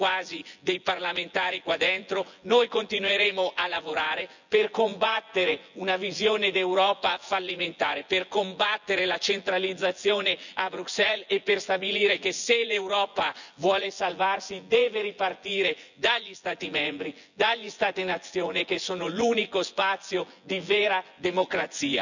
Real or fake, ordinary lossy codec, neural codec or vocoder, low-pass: fake; none; vocoder, 24 kHz, 100 mel bands, Vocos; 7.2 kHz